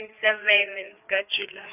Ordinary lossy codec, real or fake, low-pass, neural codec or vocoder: none; fake; 3.6 kHz; codec, 16 kHz, 4 kbps, FreqCodec, larger model